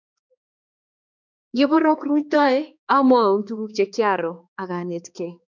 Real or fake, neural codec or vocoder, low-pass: fake; codec, 16 kHz, 2 kbps, X-Codec, HuBERT features, trained on balanced general audio; 7.2 kHz